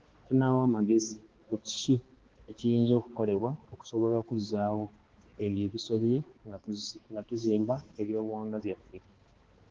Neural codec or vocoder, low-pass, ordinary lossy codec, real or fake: codec, 16 kHz, 2 kbps, X-Codec, HuBERT features, trained on balanced general audio; 7.2 kHz; Opus, 16 kbps; fake